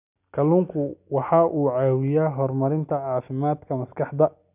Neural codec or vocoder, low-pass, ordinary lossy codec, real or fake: none; 3.6 kHz; none; real